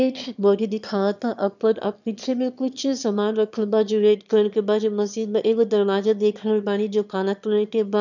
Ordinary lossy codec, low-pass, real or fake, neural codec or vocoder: none; 7.2 kHz; fake; autoencoder, 22.05 kHz, a latent of 192 numbers a frame, VITS, trained on one speaker